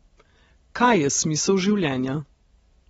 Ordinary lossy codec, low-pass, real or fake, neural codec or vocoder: AAC, 24 kbps; 19.8 kHz; real; none